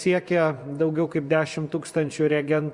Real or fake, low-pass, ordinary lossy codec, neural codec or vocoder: fake; 10.8 kHz; Opus, 64 kbps; vocoder, 24 kHz, 100 mel bands, Vocos